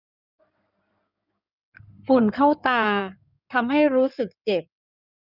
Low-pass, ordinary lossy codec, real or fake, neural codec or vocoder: 5.4 kHz; none; fake; codec, 16 kHz in and 24 kHz out, 2.2 kbps, FireRedTTS-2 codec